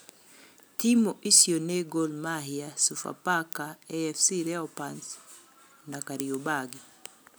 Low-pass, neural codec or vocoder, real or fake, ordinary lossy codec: none; none; real; none